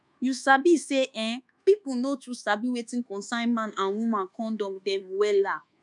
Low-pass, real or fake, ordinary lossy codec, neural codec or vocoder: 10.8 kHz; fake; none; codec, 24 kHz, 1.2 kbps, DualCodec